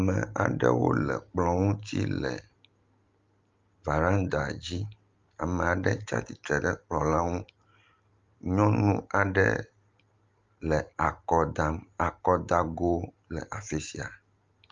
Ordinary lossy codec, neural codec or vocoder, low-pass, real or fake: Opus, 32 kbps; none; 7.2 kHz; real